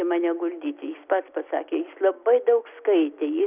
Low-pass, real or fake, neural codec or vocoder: 3.6 kHz; real; none